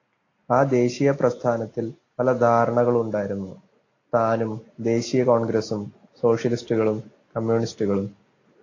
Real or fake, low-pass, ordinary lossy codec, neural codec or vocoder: real; 7.2 kHz; AAC, 32 kbps; none